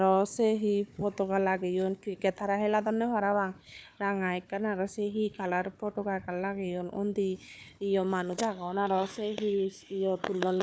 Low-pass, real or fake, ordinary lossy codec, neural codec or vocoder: none; fake; none; codec, 16 kHz, 4 kbps, FunCodec, trained on Chinese and English, 50 frames a second